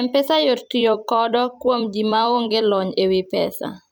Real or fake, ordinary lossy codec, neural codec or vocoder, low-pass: fake; none; vocoder, 44.1 kHz, 128 mel bands every 256 samples, BigVGAN v2; none